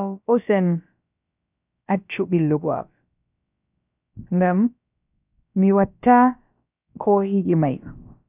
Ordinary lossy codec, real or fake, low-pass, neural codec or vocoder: none; fake; 3.6 kHz; codec, 16 kHz, about 1 kbps, DyCAST, with the encoder's durations